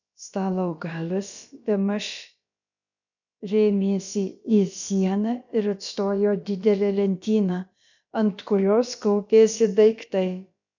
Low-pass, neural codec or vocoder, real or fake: 7.2 kHz; codec, 16 kHz, about 1 kbps, DyCAST, with the encoder's durations; fake